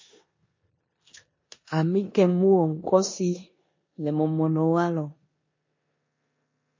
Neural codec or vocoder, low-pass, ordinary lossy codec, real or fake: codec, 16 kHz in and 24 kHz out, 0.9 kbps, LongCat-Audio-Codec, four codebook decoder; 7.2 kHz; MP3, 32 kbps; fake